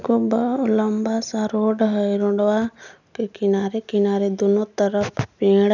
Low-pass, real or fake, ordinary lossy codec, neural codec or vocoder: 7.2 kHz; real; none; none